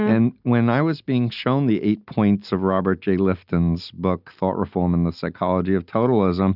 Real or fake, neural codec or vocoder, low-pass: real; none; 5.4 kHz